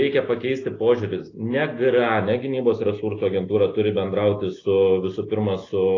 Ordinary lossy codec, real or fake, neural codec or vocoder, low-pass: AAC, 32 kbps; real; none; 7.2 kHz